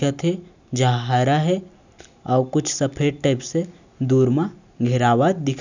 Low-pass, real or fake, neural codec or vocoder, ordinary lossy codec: 7.2 kHz; real; none; none